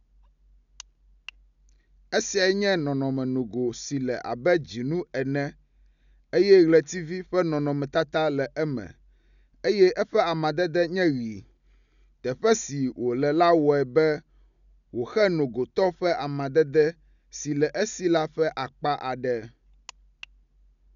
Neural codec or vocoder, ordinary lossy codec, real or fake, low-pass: none; none; real; 7.2 kHz